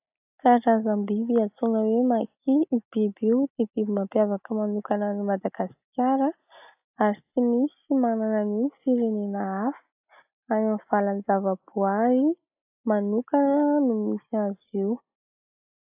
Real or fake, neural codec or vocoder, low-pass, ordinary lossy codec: real; none; 3.6 kHz; AAC, 32 kbps